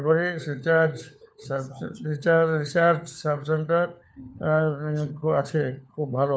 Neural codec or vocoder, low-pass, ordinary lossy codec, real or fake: codec, 16 kHz, 4 kbps, FunCodec, trained on LibriTTS, 50 frames a second; none; none; fake